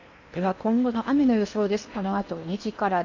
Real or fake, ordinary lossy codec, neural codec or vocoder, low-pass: fake; none; codec, 16 kHz in and 24 kHz out, 0.8 kbps, FocalCodec, streaming, 65536 codes; 7.2 kHz